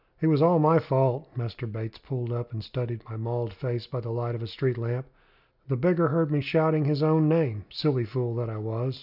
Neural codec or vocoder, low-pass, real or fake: none; 5.4 kHz; real